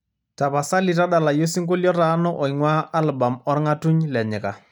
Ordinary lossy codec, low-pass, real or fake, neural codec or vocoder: none; 19.8 kHz; real; none